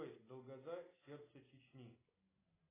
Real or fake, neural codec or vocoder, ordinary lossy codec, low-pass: real; none; AAC, 16 kbps; 3.6 kHz